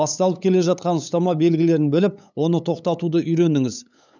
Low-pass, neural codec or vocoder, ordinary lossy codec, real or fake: 7.2 kHz; codec, 16 kHz, 8 kbps, FunCodec, trained on LibriTTS, 25 frames a second; none; fake